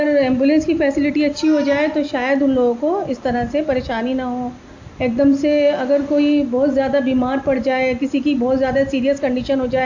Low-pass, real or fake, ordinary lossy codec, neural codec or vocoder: 7.2 kHz; real; AAC, 48 kbps; none